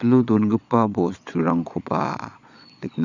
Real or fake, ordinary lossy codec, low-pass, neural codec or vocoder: fake; none; 7.2 kHz; vocoder, 22.05 kHz, 80 mel bands, Vocos